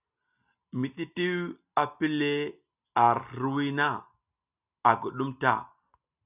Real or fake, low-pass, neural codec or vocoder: real; 3.6 kHz; none